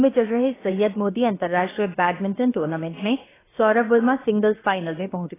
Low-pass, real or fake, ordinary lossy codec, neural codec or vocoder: 3.6 kHz; fake; AAC, 16 kbps; codec, 16 kHz, about 1 kbps, DyCAST, with the encoder's durations